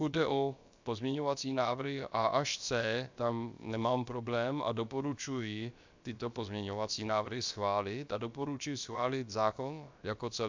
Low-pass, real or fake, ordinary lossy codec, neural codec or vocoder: 7.2 kHz; fake; MP3, 64 kbps; codec, 16 kHz, about 1 kbps, DyCAST, with the encoder's durations